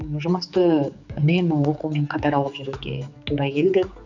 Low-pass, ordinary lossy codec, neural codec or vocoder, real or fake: 7.2 kHz; none; codec, 16 kHz, 4 kbps, X-Codec, HuBERT features, trained on general audio; fake